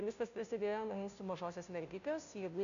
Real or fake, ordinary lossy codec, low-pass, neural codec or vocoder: fake; MP3, 96 kbps; 7.2 kHz; codec, 16 kHz, 0.5 kbps, FunCodec, trained on Chinese and English, 25 frames a second